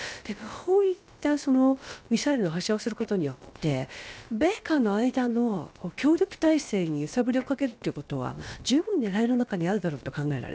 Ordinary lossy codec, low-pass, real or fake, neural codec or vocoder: none; none; fake; codec, 16 kHz, about 1 kbps, DyCAST, with the encoder's durations